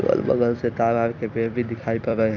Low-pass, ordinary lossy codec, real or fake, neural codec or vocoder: 7.2 kHz; none; fake; vocoder, 44.1 kHz, 128 mel bands every 256 samples, BigVGAN v2